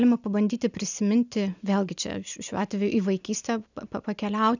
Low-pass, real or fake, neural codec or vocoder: 7.2 kHz; real; none